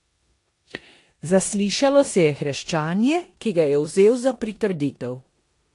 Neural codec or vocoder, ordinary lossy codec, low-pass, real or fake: codec, 16 kHz in and 24 kHz out, 0.9 kbps, LongCat-Audio-Codec, four codebook decoder; AAC, 48 kbps; 10.8 kHz; fake